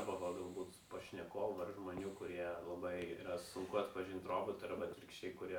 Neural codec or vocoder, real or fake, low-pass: none; real; 19.8 kHz